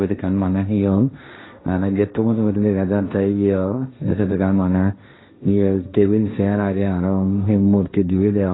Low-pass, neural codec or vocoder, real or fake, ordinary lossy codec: 7.2 kHz; codec, 16 kHz, 1.1 kbps, Voila-Tokenizer; fake; AAC, 16 kbps